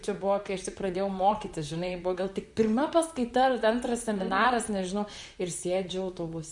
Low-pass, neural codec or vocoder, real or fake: 10.8 kHz; vocoder, 44.1 kHz, 128 mel bands, Pupu-Vocoder; fake